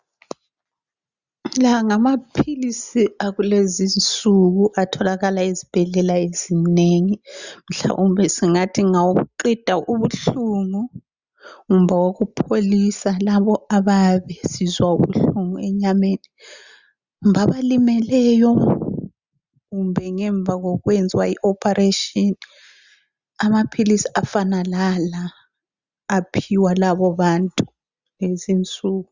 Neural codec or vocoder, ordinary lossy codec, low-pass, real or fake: none; Opus, 64 kbps; 7.2 kHz; real